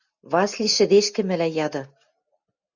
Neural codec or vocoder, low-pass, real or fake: none; 7.2 kHz; real